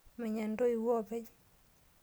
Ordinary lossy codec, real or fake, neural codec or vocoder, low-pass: none; real; none; none